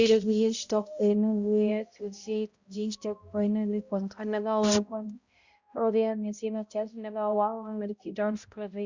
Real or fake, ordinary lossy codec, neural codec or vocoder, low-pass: fake; Opus, 64 kbps; codec, 16 kHz, 0.5 kbps, X-Codec, HuBERT features, trained on balanced general audio; 7.2 kHz